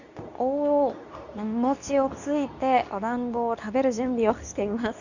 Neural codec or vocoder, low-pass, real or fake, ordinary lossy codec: codec, 24 kHz, 0.9 kbps, WavTokenizer, medium speech release version 2; 7.2 kHz; fake; none